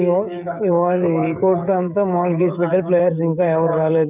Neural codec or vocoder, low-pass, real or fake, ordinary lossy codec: codec, 16 kHz, 16 kbps, FreqCodec, smaller model; 3.6 kHz; fake; none